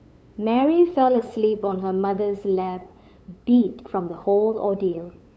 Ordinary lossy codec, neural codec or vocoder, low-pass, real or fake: none; codec, 16 kHz, 8 kbps, FunCodec, trained on LibriTTS, 25 frames a second; none; fake